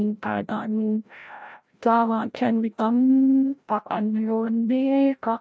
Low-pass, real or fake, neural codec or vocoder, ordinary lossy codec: none; fake; codec, 16 kHz, 0.5 kbps, FreqCodec, larger model; none